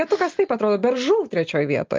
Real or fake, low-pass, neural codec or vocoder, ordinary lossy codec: real; 7.2 kHz; none; Opus, 24 kbps